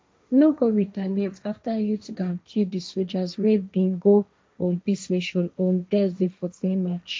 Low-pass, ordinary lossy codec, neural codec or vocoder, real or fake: none; none; codec, 16 kHz, 1.1 kbps, Voila-Tokenizer; fake